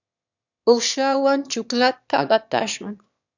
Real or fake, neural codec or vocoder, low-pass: fake; autoencoder, 22.05 kHz, a latent of 192 numbers a frame, VITS, trained on one speaker; 7.2 kHz